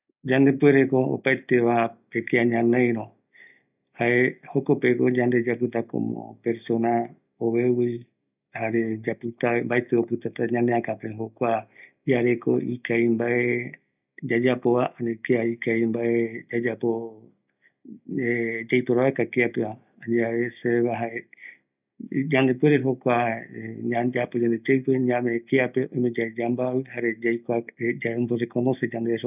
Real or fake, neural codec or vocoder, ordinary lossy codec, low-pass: real; none; none; 3.6 kHz